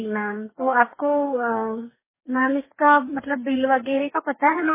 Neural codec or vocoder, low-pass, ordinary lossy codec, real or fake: codec, 44.1 kHz, 2.6 kbps, DAC; 3.6 kHz; MP3, 16 kbps; fake